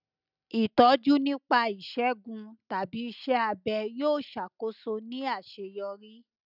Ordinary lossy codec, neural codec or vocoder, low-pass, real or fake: none; none; 5.4 kHz; real